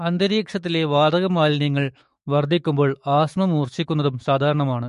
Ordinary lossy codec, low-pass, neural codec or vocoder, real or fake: MP3, 48 kbps; 14.4 kHz; autoencoder, 48 kHz, 128 numbers a frame, DAC-VAE, trained on Japanese speech; fake